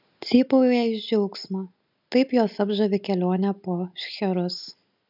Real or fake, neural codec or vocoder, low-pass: real; none; 5.4 kHz